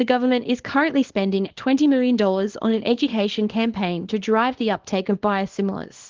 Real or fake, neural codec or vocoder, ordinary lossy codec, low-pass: fake; codec, 24 kHz, 0.9 kbps, WavTokenizer, small release; Opus, 16 kbps; 7.2 kHz